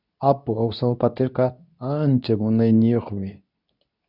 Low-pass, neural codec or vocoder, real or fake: 5.4 kHz; codec, 24 kHz, 0.9 kbps, WavTokenizer, medium speech release version 2; fake